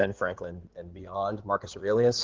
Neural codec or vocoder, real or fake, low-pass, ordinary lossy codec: codec, 16 kHz in and 24 kHz out, 2.2 kbps, FireRedTTS-2 codec; fake; 7.2 kHz; Opus, 16 kbps